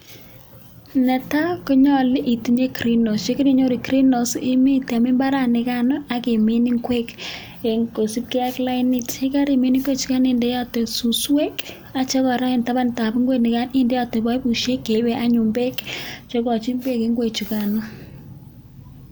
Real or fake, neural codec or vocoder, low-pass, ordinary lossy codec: real; none; none; none